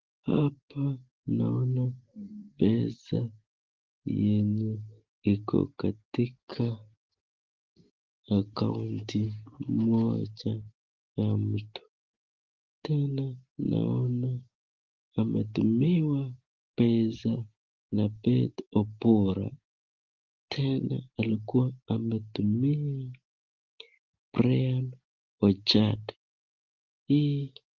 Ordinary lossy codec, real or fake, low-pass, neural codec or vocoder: Opus, 16 kbps; real; 7.2 kHz; none